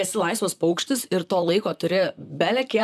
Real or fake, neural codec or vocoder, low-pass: fake; vocoder, 44.1 kHz, 128 mel bands, Pupu-Vocoder; 14.4 kHz